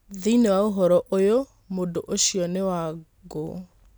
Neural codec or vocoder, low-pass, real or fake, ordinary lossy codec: none; none; real; none